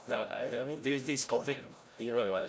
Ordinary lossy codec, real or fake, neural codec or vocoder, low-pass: none; fake; codec, 16 kHz, 0.5 kbps, FreqCodec, larger model; none